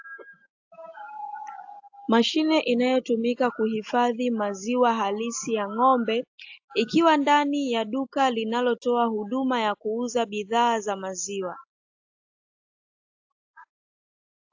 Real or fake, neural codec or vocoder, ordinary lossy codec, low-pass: real; none; AAC, 48 kbps; 7.2 kHz